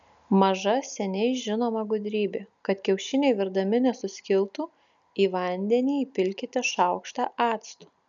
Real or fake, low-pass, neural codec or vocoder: real; 7.2 kHz; none